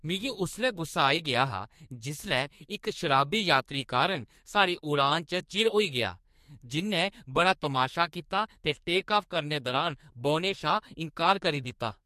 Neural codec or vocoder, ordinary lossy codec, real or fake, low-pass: codec, 44.1 kHz, 2.6 kbps, SNAC; MP3, 64 kbps; fake; 14.4 kHz